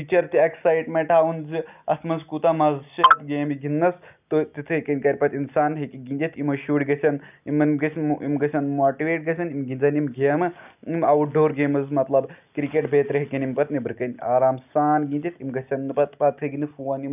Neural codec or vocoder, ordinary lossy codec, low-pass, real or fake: none; none; 3.6 kHz; real